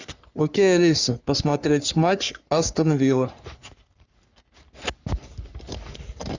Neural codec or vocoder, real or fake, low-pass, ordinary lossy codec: codec, 44.1 kHz, 3.4 kbps, Pupu-Codec; fake; 7.2 kHz; Opus, 64 kbps